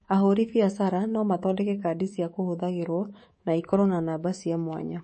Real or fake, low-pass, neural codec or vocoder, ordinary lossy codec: fake; 10.8 kHz; codec, 24 kHz, 3.1 kbps, DualCodec; MP3, 32 kbps